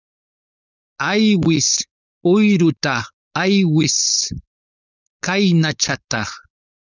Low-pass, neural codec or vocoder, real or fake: 7.2 kHz; codec, 16 kHz, 4.8 kbps, FACodec; fake